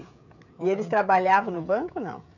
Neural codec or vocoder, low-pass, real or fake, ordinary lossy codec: codec, 16 kHz, 16 kbps, FreqCodec, smaller model; 7.2 kHz; fake; none